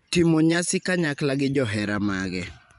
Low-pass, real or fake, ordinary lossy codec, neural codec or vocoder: 10.8 kHz; fake; none; vocoder, 24 kHz, 100 mel bands, Vocos